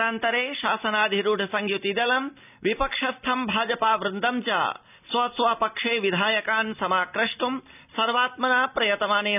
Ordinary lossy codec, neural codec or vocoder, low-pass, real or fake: none; none; 3.6 kHz; real